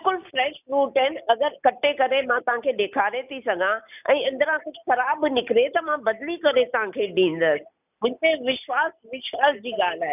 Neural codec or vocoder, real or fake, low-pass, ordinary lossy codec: none; real; 3.6 kHz; none